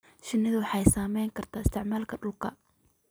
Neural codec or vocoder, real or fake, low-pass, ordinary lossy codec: none; real; none; none